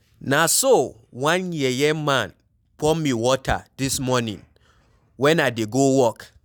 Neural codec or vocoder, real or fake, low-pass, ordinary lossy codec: none; real; none; none